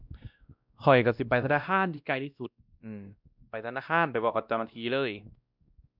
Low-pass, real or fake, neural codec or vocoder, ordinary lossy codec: 5.4 kHz; fake; codec, 16 kHz, 1 kbps, X-Codec, WavLM features, trained on Multilingual LibriSpeech; none